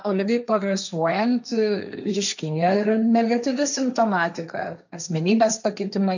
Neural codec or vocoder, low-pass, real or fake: codec, 16 kHz, 1.1 kbps, Voila-Tokenizer; 7.2 kHz; fake